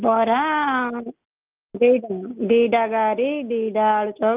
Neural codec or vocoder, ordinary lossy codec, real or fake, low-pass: none; Opus, 24 kbps; real; 3.6 kHz